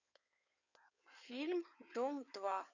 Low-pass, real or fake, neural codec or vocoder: 7.2 kHz; fake; codec, 16 kHz in and 24 kHz out, 2.2 kbps, FireRedTTS-2 codec